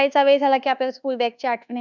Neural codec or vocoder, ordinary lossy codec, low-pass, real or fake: codec, 24 kHz, 1.2 kbps, DualCodec; none; 7.2 kHz; fake